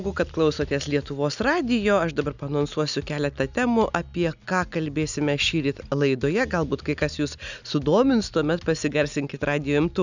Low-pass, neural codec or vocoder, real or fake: 7.2 kHz; none; real